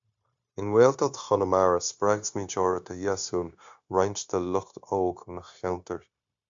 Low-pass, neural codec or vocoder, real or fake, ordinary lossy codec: 7.2 kHz; codec, 16 kHz, 0.9 kbps, LongCat-Audio-Codec; fake; AAC, 64 kbps